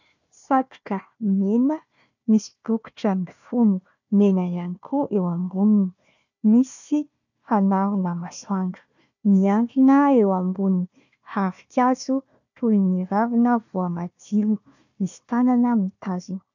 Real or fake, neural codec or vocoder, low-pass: fake; codec, 16 kHz, 1 kbps, FunCodec, trained on Chinese and English, 50 frames a second; 7.2 kHz